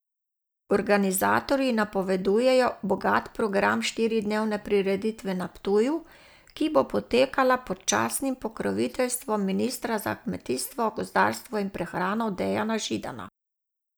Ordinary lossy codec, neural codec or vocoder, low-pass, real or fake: none; none; none; real